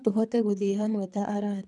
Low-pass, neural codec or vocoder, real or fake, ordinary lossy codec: 10.8 kHz; codec, 24 kHz, 3 kbps, HILCodec; fake; none